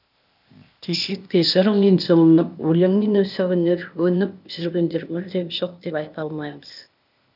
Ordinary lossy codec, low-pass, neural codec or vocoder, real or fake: none; 5.4 kHz; codec, 16 kHz, 0.8 kbps, ZipCodec; fake